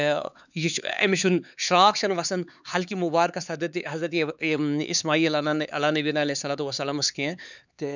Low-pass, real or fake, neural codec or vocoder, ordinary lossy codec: 7.2 kHz; fake; codec, 16 kHz, 4 kbps, X-Codec, HuBERT features, trained on LibriSpeech; none